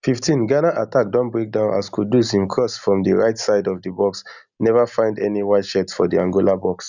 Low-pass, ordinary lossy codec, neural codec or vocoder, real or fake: 7.2 kHz; none; none; real